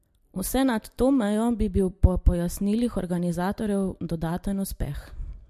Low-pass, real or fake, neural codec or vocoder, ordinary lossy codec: 14.4 kHz; real; none; MP3, 64 kbps